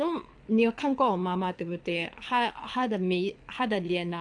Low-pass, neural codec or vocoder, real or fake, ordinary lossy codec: 9.9 kHz; codec, 24 kHz, 6 kbps, HILCodec; fake; AAC, 64 kbps